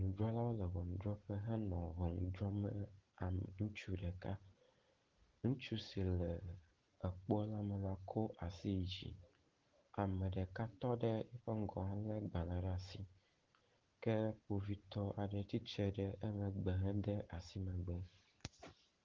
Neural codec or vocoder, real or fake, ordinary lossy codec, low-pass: none; real; Opus, 16 kbps; 7.2 kHz